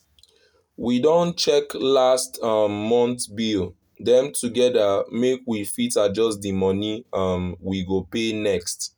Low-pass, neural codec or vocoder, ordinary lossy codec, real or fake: none; none; none; real